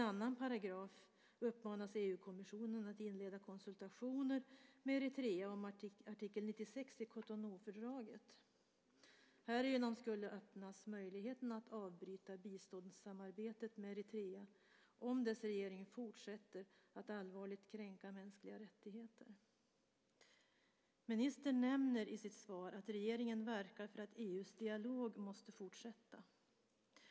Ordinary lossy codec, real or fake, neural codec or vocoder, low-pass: none; real; none; none